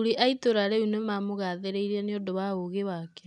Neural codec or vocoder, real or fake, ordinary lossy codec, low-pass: none; real; none; 10.8 kHz